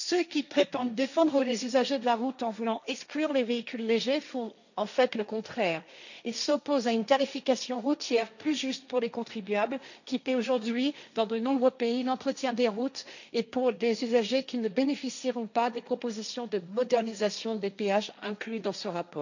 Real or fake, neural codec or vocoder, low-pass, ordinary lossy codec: fake; codec, 16 kHz, 1.1 kbps, Voila-Tokenizer; 7.2 kHz; none